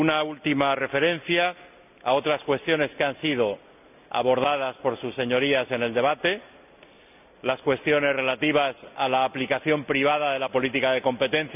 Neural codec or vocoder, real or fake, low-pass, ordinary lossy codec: none; real; 3.6 kHz; none